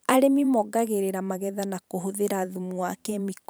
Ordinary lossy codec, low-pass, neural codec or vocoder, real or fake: none; none; vocoder, 44.1 kHz, 128 mel bands every 512 samples, BigVGAN v2; fake